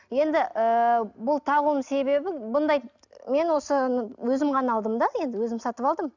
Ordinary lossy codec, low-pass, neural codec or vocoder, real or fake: none; 7.2 kHz; none; real